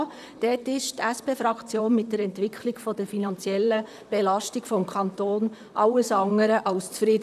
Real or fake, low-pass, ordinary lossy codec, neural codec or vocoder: fake; 14.4 kHz; none; vocoder, 44.1 kHz, 128 mel bands, Pupu-Vocoder